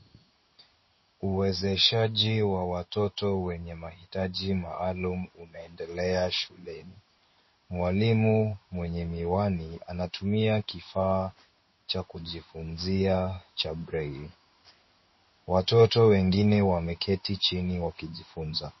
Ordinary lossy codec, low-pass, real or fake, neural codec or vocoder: MP3, 24 kbps; 7.2 kHz; fake; codec, 16 kHz in and 24 kHz out, 1 kbps, XY-Tokenizer